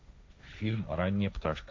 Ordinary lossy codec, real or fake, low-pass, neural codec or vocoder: none; fake; none; codec, 16 kHz, 1.1 kbps, Voila-Tokenizer